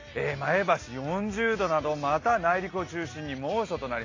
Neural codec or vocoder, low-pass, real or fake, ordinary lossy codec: none; 7.2 kHz; real; AAC, 32 kbps